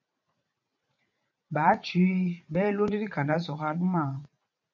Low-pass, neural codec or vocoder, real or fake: 7.2 kHz; vocoder, 44.1 kHz, 80 mel bands, Vocos; fake